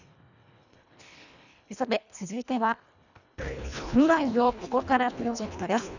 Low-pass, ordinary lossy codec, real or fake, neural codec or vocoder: 7.2 kHz; none; fake; codec, 24 kHz, 1.5 kbps, HILCodec